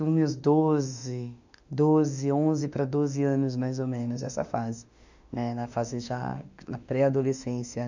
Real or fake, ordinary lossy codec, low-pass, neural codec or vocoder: fake; none; 7.2 kHz; autoencoder, 48 kHz, 32 numbers a frame, DAC-VAE, trained on Japanese speech